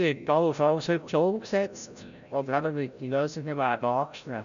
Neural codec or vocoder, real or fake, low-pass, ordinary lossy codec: codec, 16 kHz, 0.5 kbps, FreqCodec, larger model; fake; 7.2 kHz; none